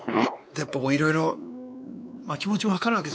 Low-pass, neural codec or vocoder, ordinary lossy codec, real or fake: none; codec, 16 kHz, 2 kbps, X-Codec, WavLM features, trained on Multilingual LibriSpeech; none; fake